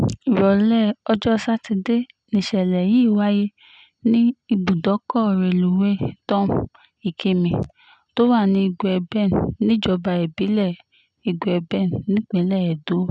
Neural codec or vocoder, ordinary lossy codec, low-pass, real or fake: none; none; 9.9 kHz; real